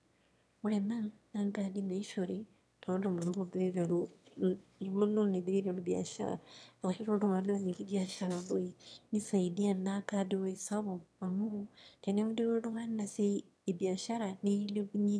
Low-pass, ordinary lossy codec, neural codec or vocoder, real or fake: none; none; autoencoder, 22.05 kHz, a latent of 192 numbers a frame, VITS, trained on one speaker; fake